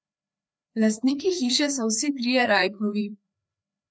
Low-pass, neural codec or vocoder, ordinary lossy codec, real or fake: none; codec, 16 kHz, 2 kbps, FreqCodec, larger model; none; fake